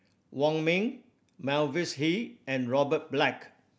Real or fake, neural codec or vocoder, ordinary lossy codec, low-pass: real; none; none; none